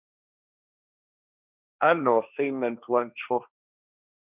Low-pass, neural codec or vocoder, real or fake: 3.6 kHz; codec, 16 kHz, 1.1 kbps, Voila-Tokenizer; fake